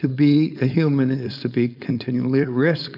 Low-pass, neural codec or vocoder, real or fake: 5.4 kHz; codec, 16 kHz, 4.8 kbps, FACodec; fake